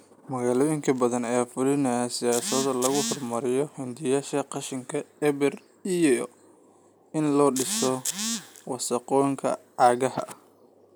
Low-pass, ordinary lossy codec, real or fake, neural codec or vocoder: none; none; real; none